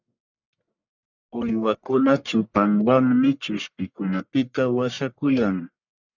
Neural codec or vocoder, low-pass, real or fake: codec, 44.1 kHz, 1.7 kbps, Pupu-Codec; 7.2 kHz; fake